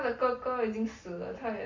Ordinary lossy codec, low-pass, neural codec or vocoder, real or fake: MP3, 32 kbps; 7.2 kHz; none; real